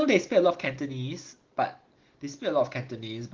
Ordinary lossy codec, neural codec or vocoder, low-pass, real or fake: Opus, 16 kbps; none; 7.2 kHz; real